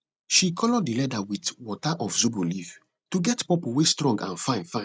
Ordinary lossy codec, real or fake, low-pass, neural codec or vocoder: none; real; none; none